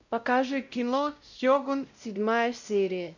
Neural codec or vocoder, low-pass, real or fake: codec, 16 kHz, 0.5 kbps, X-Codec, WavLM features, trained on Multilingual LibriSpeech; 7.2 kHz; fake